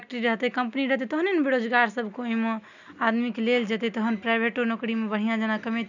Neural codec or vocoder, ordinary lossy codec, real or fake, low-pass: none; none; real; 7.2 kHz